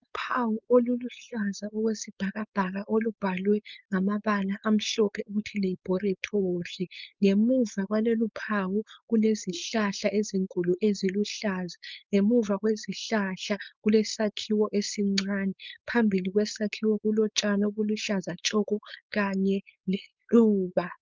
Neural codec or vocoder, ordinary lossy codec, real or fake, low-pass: codec, 16 kHz, 4.8 kbps, FACodec; Opus, 24 kbps; fake; 7.2 kHz